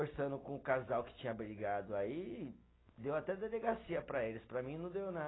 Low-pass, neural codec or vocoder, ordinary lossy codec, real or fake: 7.2 kHz; none; AAC, 16 kbps; real